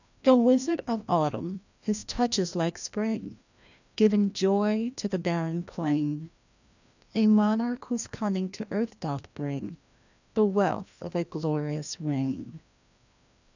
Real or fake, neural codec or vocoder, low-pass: fake; codec, 16 kHz, 1 kbps, FreqCodec, larger model; 7.2 kHz